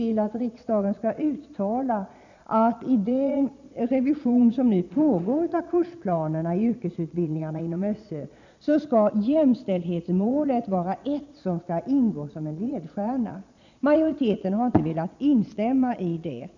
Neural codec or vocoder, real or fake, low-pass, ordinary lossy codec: vocoder, 22.05 kHz, 80 mel bands, Vocos; fake; 7.2 kHz; none